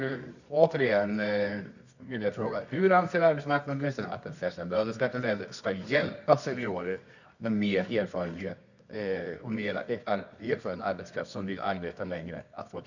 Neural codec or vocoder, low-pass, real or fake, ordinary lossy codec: codec, 24 kHz, 0.9 kbps, WavTokenizer, medium music audio release; 7.2 kHz; fake; none